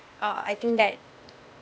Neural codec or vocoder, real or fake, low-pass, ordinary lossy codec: codec, 16 kHz, 0.8 kbps, ZipCodec; fake; none; none